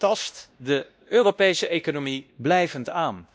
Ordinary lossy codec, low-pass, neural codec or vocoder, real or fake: none; none; codec, 16 kHz, 1 kbps, X-Codec, WavLM features, trained on Multilingual LibriSpeech; fake